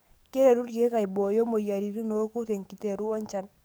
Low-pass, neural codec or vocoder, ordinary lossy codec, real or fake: none; codec, 44.1 kHz, 7.8 kbps, DAC; none; fake